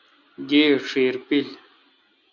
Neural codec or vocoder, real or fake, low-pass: none; real; 7.2 kHz